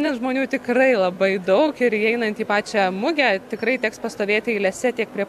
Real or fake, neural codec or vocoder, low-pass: real; none; 14.4 kHz